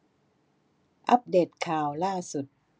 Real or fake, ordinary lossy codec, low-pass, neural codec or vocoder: real; none; none; none